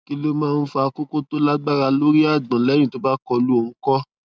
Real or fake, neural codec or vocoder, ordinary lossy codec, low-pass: real; none; none; none